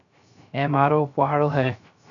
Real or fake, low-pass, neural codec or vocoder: fake; 7.2 kHz; codec, 16 kHz, 0.3 kbps, FocalCodec